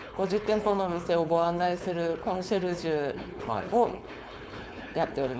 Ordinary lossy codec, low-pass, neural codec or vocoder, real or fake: none; none; codec, 16 kHz, 4.8 kbps, FACodec; fake